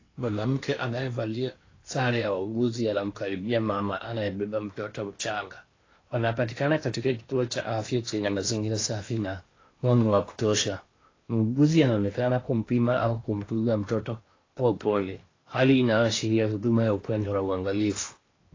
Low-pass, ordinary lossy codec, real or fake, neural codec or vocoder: 7.2 kHz; AAC, 32 kbps; fake; codec, 16 kHz in and 24 kHz out, 0.8 kbps, FocalCodec, streaming, 65536 codes